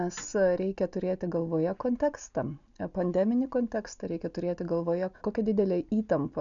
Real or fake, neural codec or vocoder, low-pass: real; none; 7.2 kHz